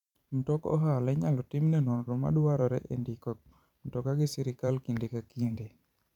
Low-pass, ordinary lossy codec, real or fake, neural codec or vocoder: 19.8 kHz; none; fake; vocoder, 44.1 kHz, 128 mel bands every 512 samples, BigVGAN v2